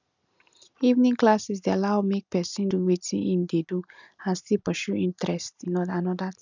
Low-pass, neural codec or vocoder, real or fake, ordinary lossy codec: 7.2 kHz; none; real; none